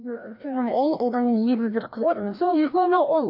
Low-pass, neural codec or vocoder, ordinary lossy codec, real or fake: 5.4 kHz; codec, 16 kHz, 1 kbps, FreqCodec, larger model; none; fake